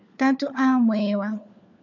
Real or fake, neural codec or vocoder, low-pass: fake; codec, 16 kHz, 4 kbps, FunCodec, trained on LibriTTS, 50 frames a second; 7.2 kHz